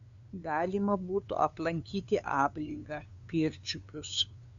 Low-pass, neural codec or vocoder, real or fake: 7.2 kHz; codec, 16 kHz, 2 kbps, FunCodec, trained on LibriTTS, 25 frames a second; fake